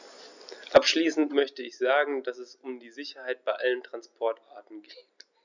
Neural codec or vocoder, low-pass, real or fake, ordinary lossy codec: none; 7.2 kHz; real; none